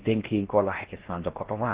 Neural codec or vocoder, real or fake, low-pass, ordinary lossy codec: codec, 16 kHz in and 24 kHz out, 0.6 kbps, FocalCodec, streaming, 4096 codes; fake; 3.6 kHz; Opus, 16 kbps